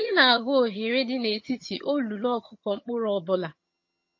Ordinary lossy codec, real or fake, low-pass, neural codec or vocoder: MP3, 32 kbps; fake; 7.2 kHz; vocoder, 22.05 kHz, 80 mel bands, HiFi-GAN